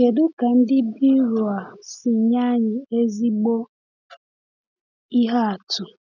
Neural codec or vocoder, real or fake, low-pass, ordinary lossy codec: none; real; 7.2 kHz; none